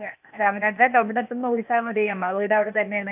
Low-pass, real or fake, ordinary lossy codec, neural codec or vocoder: 3.6 kHz; fake; none; codec, 16 kHz, 0.8 kbps, ZipCodec